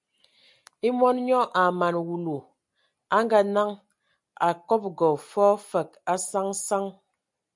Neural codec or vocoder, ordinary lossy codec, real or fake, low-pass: none; MP3, 96 kbps; real; 10.8 kHz